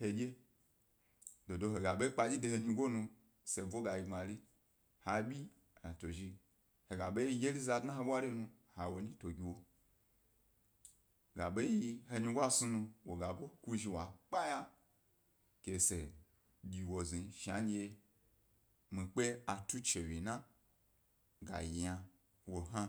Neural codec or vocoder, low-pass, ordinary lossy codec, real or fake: none; none; none; real